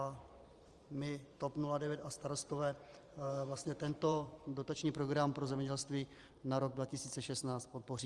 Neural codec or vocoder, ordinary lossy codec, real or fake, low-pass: vocoder, 44.1 kHz, 128 mel bands every 512 samples, BigVGAN v2; Opus, 32 kbps; fake; 10.8 kHz